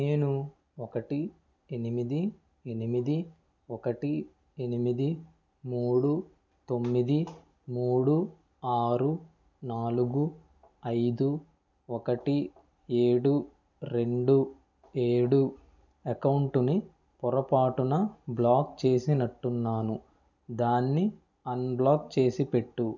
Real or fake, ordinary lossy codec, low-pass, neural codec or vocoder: real; none; 7.2 kHz; none